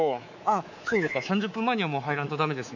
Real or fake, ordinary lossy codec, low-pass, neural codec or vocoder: fake; none; 7.2 kHz; codec, 24 kHz, 3.1 kbps, DualCodec